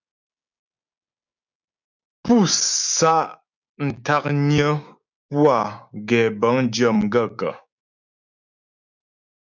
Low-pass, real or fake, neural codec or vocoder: 7.2 kHz; fake; codec, 16 kHz, 6 kbps, DAC